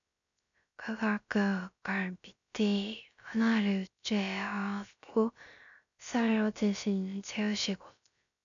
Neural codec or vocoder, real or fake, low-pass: codec, 16 kHz, 0.3 kbps, FocalCodec; fake; 7.2 kHz